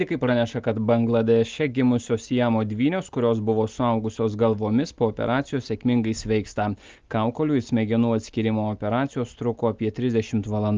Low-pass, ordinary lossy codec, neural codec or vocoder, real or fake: 7.2 kHz; Opus, 32 kbps; none; real